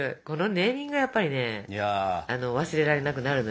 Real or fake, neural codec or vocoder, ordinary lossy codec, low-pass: real; none; none; none